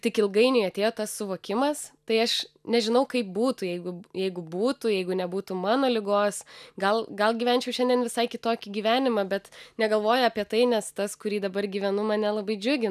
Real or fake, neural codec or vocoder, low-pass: real; none; 14.4 kHz